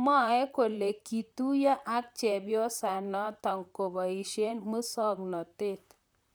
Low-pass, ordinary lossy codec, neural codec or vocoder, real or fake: none; none; vocoder, 44.1 kHz, 128 mel bands, Pupu-Vocoder; fake